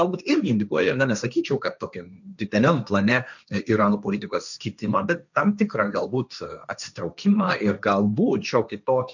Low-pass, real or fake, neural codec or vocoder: 7.2 kHz; fake; codec, 16 kHz, 1.1 kbps, Voila-Tokenizer